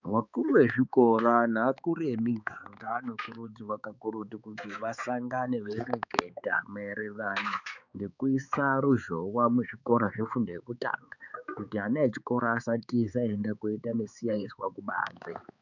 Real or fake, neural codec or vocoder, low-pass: fake; codec, 16 kHz, 4 kbps, X-Codec, HuBERT features, trained on balanced general audio; 7.2 kHz